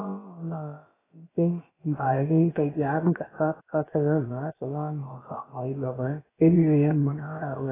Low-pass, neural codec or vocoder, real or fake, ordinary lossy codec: 3.6 kHz; codec, 16 kHz, about 1 kbps, DyCAST, with the encoder's durations; fake; AAC, 16 kbps